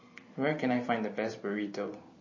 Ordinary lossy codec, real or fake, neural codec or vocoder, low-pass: MP3, 32 kbps; fake; vocoder, 44.1 kHz, 128 mel bands every 512 samples, BigVGAN v2; 7.2 kHz